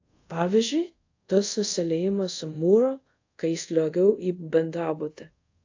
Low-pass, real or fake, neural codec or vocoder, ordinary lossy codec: 7.2 kHz; fake; codec, 24 kHz, 0.5 kbps, DualCodec; AAC, 48 kbps